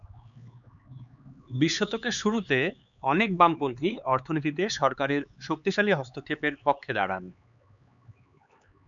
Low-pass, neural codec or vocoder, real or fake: 7.2 kHz; codec, 16 kHz, 4 kbps, X-Codec, HuBERT features, trained on LibriSpeech; fake